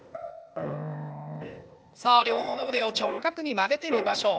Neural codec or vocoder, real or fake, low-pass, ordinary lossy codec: codec, 16 kHz, 0.8 kbps, ZipCodec; fake; none; none